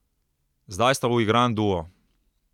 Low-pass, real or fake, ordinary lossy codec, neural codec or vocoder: 19.8 kHz; real; none; none